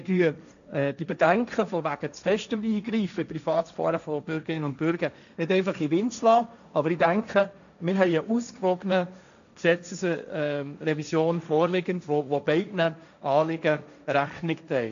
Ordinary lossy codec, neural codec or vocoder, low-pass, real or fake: none; codec, 16 kHz, 1.1 kbps, Voila-Tokenizer; 7.2 kHz; fake